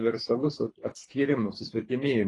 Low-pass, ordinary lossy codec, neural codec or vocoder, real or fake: 10.8 kHz; AAC, 32 kbps; codec, 24 kHz, 3 kbps, HILCodec; fake